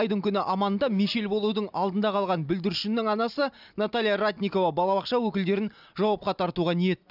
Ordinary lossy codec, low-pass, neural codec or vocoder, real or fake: none; 5.4 kHz; none; real